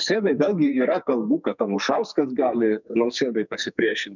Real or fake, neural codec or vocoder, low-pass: fake; codec, 44.1 kHz, 2.6 kbps, SNAC; 7.2 kHz